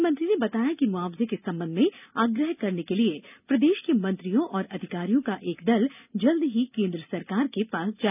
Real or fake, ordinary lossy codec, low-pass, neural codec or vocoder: real; none; 3.6 kHz; none